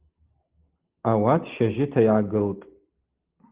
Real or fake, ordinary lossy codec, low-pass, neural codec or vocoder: real; Opus, 16 kbps; 3.6 kHz; none